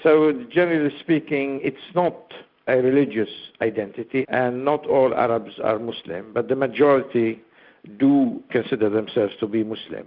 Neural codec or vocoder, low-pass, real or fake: none; 5.4 kHz; real